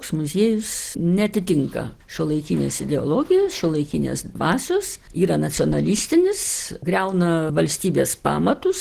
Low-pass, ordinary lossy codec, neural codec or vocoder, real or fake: 14.4 kHz; Opus, 16 kbps; none; real